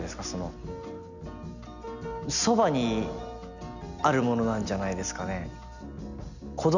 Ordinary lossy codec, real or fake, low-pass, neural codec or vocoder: none; real; 7.2 kHz; none